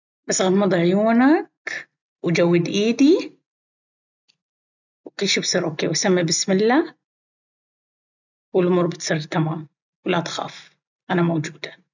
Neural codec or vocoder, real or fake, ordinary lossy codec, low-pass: none; real; none; 7.2 kHz